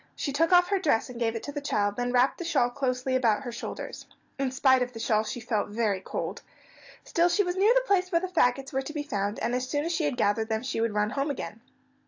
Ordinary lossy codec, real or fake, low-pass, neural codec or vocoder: AAC, 48 kbps; real; 7.2 kHz; none